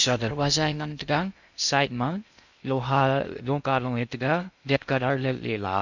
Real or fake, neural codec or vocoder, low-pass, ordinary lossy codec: fake; codec, 16 kHz in and 24 kHz out, 0.6 kbps, FocalCodec, streaming, 2048 codes; 7.2 kHz; none